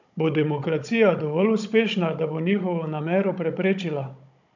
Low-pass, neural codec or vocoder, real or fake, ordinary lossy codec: 7.2 kHz; codec, 16 kHz, 16 kbps, FunCodec, trained on Chinese and English, 50 frames a second; fake; none